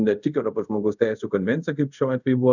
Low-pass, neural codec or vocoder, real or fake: 7.2 kHz; codec, 24 kHz, 0.5 kbps, DualCodec; fake